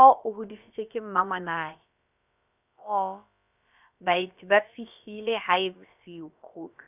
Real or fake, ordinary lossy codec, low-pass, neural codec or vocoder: fake; none; 3.6 kHz; codec, 16 kHz, about 1 kbps, DyCAST, with the encoder's durations